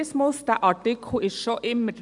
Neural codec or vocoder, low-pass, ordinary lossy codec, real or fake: none; 10.8 kHz; none; real